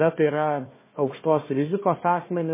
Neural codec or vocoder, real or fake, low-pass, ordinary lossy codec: codec, 16 kHz, 1 kbps, FunCodec, trained on Chinese and English, 50 frames a second; fake; 3.6 kHz; MP3, 16 kbps